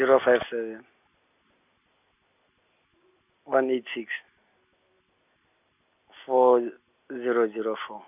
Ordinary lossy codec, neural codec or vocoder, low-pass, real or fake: none; none; 3.6 kHz; real